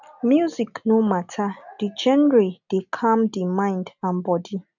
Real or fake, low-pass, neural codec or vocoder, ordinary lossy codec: real; 7.2 kHz; none; none